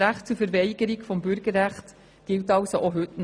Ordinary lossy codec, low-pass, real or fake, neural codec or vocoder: none; 9.9 kHz; real; none